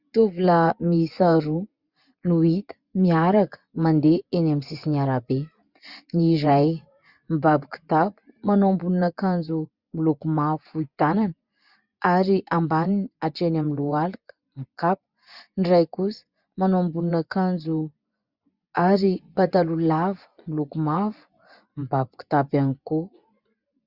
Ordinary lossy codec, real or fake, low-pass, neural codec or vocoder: Opus, 64 kbps; fake; 5.4 kHz; vocoder, 24 kHz, 100 mel bands, Vocos